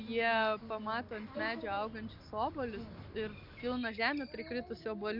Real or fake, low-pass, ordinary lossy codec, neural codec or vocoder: real; 5.4 kHz; MP3, 48 kbps; none